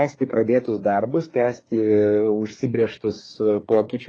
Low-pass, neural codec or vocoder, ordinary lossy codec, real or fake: 9.9 kHz; codec, 24 kHz, 1 kbps, SNAC; AAC, 32 kbps; fake